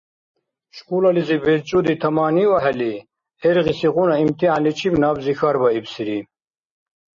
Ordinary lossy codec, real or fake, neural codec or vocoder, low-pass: MP3, 32 kbps; real; none; 7.2 kHz